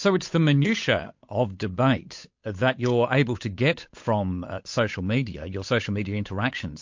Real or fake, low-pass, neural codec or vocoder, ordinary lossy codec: fake; 7.2 kHz; codec, 16 kHz, 8 kbps, FunCodec, trained on Chinese and English, 25 frames a second; MP3, 48 kbps